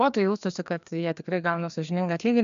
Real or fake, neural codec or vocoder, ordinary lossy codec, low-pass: fake; codec, 16 kHz, 2 kbps, FreqCodec, larger model; AAC, 96 kbps; 7.2 kHz